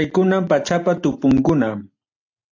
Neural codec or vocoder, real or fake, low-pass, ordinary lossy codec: none; real; 7.2 kHz; AAC, 48 kbps